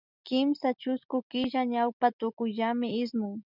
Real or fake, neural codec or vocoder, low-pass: real; none; 5.4 kHz